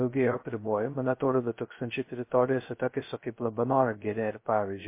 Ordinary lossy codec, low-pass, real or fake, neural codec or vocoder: MP3, 24 kbps; 3.6 kHz; fake; codec, 16 kHz, 0.2 kbps, FocalCodec